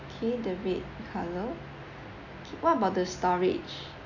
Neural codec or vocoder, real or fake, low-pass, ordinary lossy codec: none; real; 7.2 kHz; none